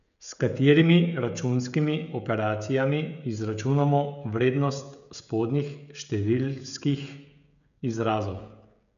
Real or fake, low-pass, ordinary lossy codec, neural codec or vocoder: fake; 7.2 kHz; none; codec, 16 kHz, 16 kbps, FreqCodec, smaller model